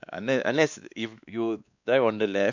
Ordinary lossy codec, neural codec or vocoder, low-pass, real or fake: none; codec, 16 kHz, 2 kbps, X-Codec, WavLM features, trained on Multilingual LibriSpeech; 7.2 kHz; fake